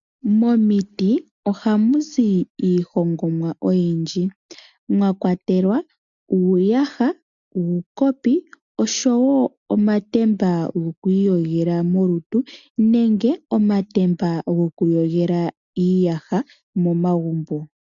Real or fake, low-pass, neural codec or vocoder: real; 7.2 kHz; none